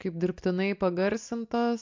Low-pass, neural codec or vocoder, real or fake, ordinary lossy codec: 7.2 kHz; none; real; MP3, 64 kbps